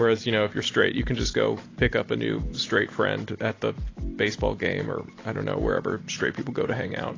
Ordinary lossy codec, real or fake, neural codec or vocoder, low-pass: AAC, 32 kbps; real; none; 7.2 kHz